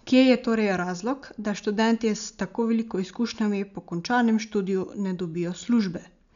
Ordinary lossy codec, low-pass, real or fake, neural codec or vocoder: none; 7.2 kHz; real; none